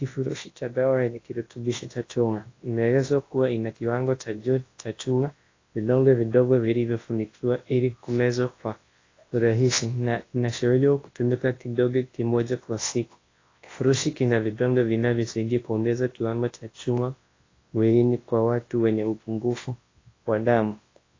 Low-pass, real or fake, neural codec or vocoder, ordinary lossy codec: 7.2 kHz; fake; codec, 24 kHz, 0.9 kbps, WavTokenizer, large speech release; AAC, 32 kbps